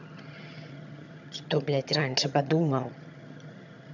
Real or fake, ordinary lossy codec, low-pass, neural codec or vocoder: fake; none; 7.2 kHz; vocoder, 22.05 kHz, 80 mel bands, HiFi-GAN